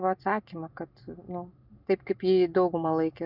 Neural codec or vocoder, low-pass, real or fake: none; 5.4 kHz; real